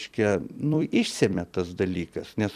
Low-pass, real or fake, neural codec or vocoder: 14.4 kHz; fake; vocoder, 44.1 kHz, 128 mel bands every 256 samples, BigVGAN v2